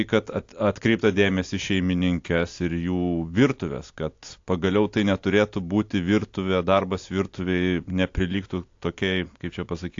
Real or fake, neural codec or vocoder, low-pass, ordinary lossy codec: real; none; 7.2 kHz; AAC, 48 kbps